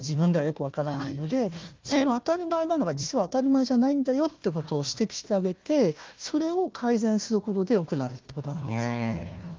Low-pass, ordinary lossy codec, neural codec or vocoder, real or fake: 7.2 kHz; Opus, 24 kbps; codec, 16 kHz, 1 kbps, FunCodec, trained on Chinese and English, 50 frames a second; fake